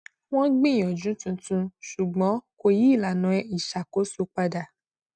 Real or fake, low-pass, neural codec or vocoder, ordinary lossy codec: real; 9.9 kHz; none; none